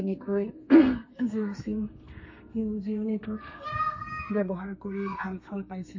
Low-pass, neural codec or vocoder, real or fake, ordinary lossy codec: 7.2 kHz; codec, 32 kHz, 1.9 kbps, SNAC; fake; MP3, 32 kbps